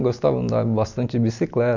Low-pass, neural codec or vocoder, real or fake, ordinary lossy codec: 7.2 kHz; none; real; none